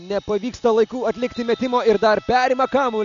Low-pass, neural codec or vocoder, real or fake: 7.2 kHz; none; real